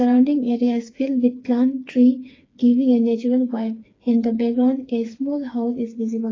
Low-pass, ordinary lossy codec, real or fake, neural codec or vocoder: 7.2 kHz; AAC, 32 kbps; fake; codec, 16 kHz, 4 kbps, FreqCodec, smaller model